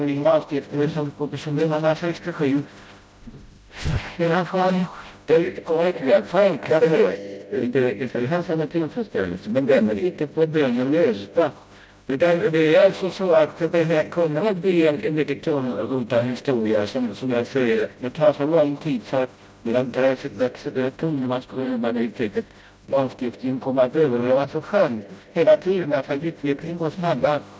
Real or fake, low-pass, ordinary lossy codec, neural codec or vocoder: fake; none; none; codec, 16 kHz, 0.5 kbps, FreqCodec, smaller model